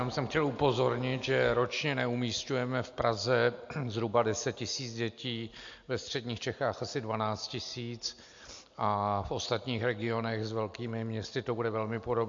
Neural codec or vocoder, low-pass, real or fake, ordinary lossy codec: none; 7.2 kHz; real; AAC, 64 kbps